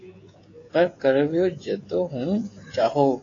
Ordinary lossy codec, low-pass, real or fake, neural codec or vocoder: AAC, 32 kbps; 7.2 kHz; fake; codec, 16 kHz, 16 kbps, FreqCodec, smaller model